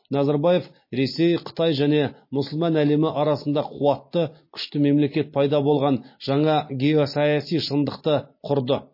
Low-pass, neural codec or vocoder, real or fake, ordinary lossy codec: 5.4 kHz; none; real; MP3, 24 kbps